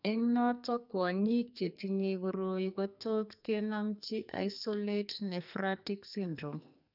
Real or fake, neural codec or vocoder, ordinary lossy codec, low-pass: fake; codec, 44.1 kHz, 2.6 kbps, SNAC; none; 5.4 kHz